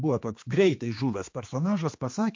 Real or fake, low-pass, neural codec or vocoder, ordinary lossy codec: fake; 7.2 kHz; codec, 16 kHz, 2 kbps, X-Codec, HuBERT features, trained on general audio; MP3, 48 kbps